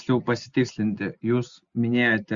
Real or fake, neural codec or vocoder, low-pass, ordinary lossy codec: real; none; 7.2 kHz; Opus, 64 kbps